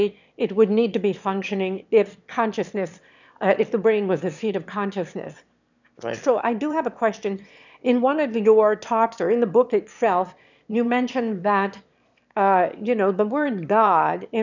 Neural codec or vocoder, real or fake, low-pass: autoencoder, 22.05 kHz, a latent of 192 numbers a frame, VITS, trained on one speaker; fake; 7.2 kHz